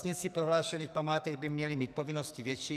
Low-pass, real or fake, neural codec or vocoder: 14.4 kHz; fake; codec, 44.1 kHz, 2.6 kbps, SNAC